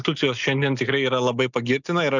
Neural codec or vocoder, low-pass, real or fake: none; 7.2 kHz; real